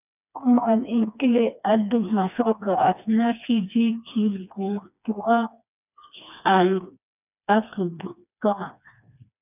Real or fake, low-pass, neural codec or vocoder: fake; 3.6 kHz; codec, 16 kHz, 2 kbps, FreqCodec, smaller model